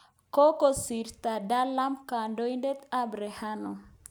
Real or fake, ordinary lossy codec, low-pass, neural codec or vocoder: real; none; none; none